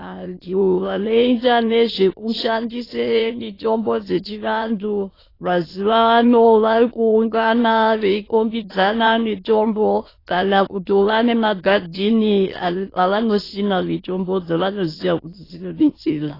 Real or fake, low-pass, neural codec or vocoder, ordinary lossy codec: fake; 5.4 kHz; autoencoder, 22.05 kHz, a latent of 192 numbers a frame, VITS, trained on many speakers; AAC, 24 kbps